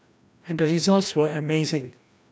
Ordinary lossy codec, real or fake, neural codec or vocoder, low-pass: none; fake; codec, 16 kHz, 1 kbps, FreqCodec, larger model; none